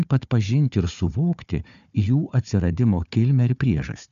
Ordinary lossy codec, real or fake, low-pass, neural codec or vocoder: MP3, 96 kbps; fake; 7.2 kHz; codec, 16 kHz, 4 kbps, FunCodec, trained on Chinese and English, 50 frames a second